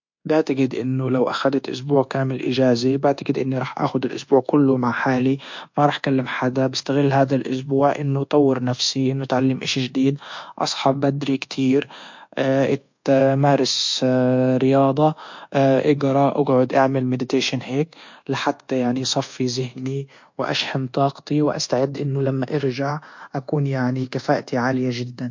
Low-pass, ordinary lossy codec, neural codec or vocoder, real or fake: 7.2 kHz; MP3, 48 kbps; codec, 24 kHz, 1.2 kbps, DualCodec; fake